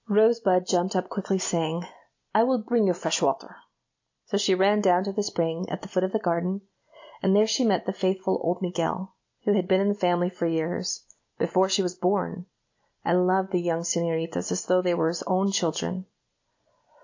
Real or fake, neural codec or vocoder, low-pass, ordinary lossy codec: real; none; 7.2 kHz; AAC, 48 kbps